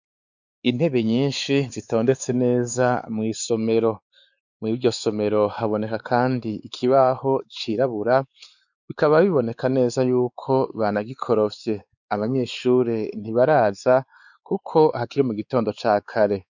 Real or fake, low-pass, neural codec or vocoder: fake; 7.2 kHz; codec, 16 kHz, 4 kbps, X-Codec, WavLM features, trained on Multilingual LibriSpeech